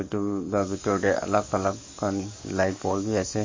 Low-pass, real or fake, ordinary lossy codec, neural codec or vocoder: 7.2 kHz; fake; MP3, 32 kbps; codec, 44.1 kHz, 7.8 kbps, DAC